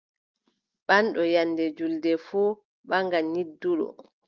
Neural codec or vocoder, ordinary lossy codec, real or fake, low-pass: none; Opus, 32 kbps; real; 7.2 kHz